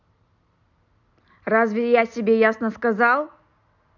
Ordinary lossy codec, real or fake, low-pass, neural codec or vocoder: none; real; 7.2 kHz; none